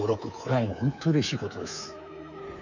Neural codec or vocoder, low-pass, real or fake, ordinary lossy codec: codec, 24 kHz, 3.1 kbps, DualCodec; 7.2 kHz; fake; none